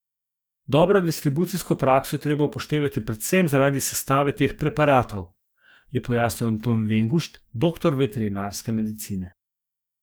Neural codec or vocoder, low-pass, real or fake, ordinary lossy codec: codec, 44.1 kHz, 2.6 kbps, DAC; none; fake; none